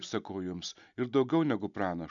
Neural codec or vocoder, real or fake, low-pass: none; real; 7.2 kHz